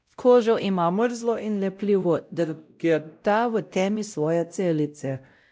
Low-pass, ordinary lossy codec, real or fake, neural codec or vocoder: none; none; fake; codec, 16 kHz, 0.5 kbps, X-Codec, WavLM features, trained on Multilingual LibriSpeech